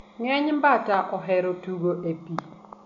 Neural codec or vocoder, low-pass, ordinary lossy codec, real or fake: none; 7.2 kHz; none; real